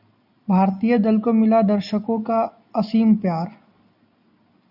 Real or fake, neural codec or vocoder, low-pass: real; none; 5.4 kHz